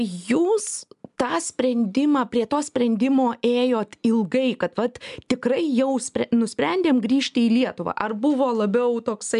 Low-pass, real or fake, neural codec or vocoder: 10.8 kHz; real; none